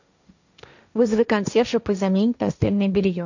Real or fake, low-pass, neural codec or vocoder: fake; 7.2 kHz; codec, 16 kHz, 1.1 kbps, Voila-Tokenizer